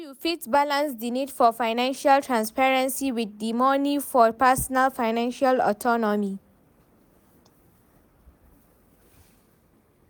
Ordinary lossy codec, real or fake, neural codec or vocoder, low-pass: none; real; none; none